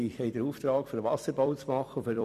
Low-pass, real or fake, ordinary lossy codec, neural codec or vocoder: 14.4 kHz; fake; none; vocoder, 44.1 kHz, 128 mel bands every 256 samples, BigVGAN v2